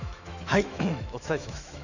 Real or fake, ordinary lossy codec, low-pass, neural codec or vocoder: fake; none; 7.2 kHz; autoencoder, 48 kHz, 128 numbers a frame, DAC-VAE, trained on Japanese speech